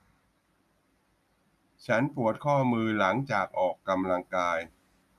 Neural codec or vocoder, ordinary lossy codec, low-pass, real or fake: none; none; 14.4 kHz; real